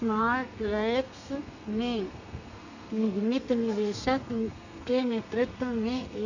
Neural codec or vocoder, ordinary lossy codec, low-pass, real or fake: codec, 32 kHz, 1.9 kbps, SNAC; none; 7.2 kHz; fake